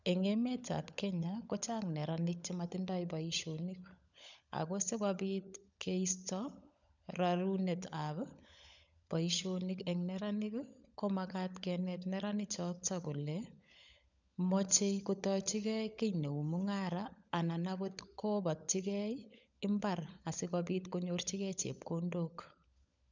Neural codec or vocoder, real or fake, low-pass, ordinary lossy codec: codec, 16 kHz, 16 kbps, FunCodec, trained on LibriTTS, 50 frames a second; fake; 7.2 kHz; none